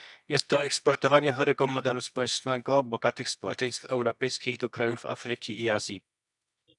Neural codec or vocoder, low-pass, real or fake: codec, 24 kHz, 0.9 kbps, WavTokenizer, medium music audio release; 10.8 kHz; fake